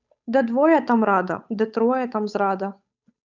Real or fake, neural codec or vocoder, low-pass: fake; codec, 16 kHz, 8 kbps, FunCodec, trained on Chinese and English, 25 frames a second; 7.2 kHz